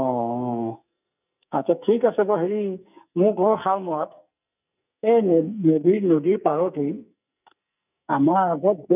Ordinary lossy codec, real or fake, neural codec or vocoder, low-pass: none; fake; codec, 44.1 kHz, 2.6 kbps, SNAC; 3.6 kHz